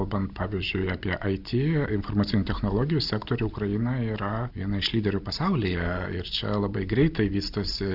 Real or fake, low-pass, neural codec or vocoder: real; 5.4 kHz; none